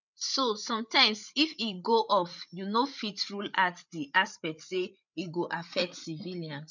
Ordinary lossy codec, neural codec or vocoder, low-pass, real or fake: none; codec, 16 kHz, 8 kbps, FreqCodec, larger model; 7.2 kHz; fake